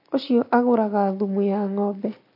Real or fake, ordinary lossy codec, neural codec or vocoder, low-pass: real; MP3, 32 kbps; none; 5.4 kHz